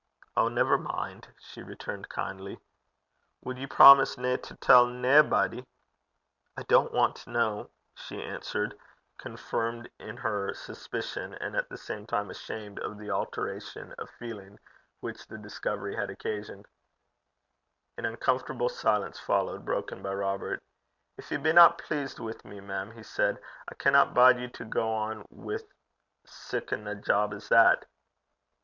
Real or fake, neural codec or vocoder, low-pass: real; none; 7.2 kHz